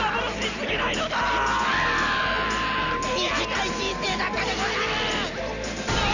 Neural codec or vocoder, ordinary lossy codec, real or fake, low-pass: none; none; real; 7.2 kHz